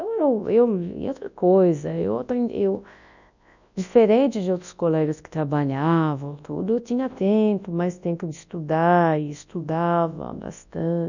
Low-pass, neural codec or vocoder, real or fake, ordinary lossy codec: 7.2 kHz; codec, 24 kHz, 0.9 kbps, WavTokenizer, large speech release; fake; none